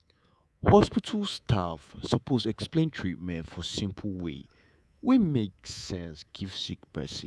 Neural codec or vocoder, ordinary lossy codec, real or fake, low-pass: codec, 24 kHz, 3.1 kbps, DualCodec; none; fake; none